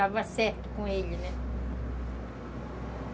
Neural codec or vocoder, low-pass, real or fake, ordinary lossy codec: none; none; real; none